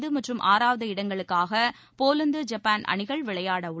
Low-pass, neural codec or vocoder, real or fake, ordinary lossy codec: none; none; real; none